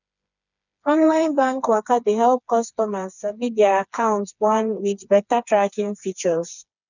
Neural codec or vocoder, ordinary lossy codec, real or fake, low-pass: codec, 16 kHz, 4 kbps, FreqCodec, smaller model; none; fake; 7.2 kHz